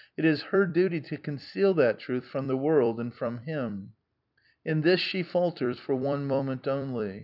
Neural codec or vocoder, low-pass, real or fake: vocoder, 44.1 kHz, 128 mel bands every 256 samples, BigVGAN v2; 5.4 kHz; fake